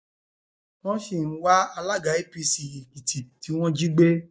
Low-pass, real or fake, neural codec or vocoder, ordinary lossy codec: none; real; none; none